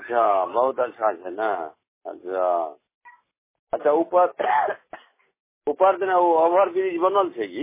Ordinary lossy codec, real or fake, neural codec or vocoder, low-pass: MP3, 16 kbps; real; none; 3.6 kHz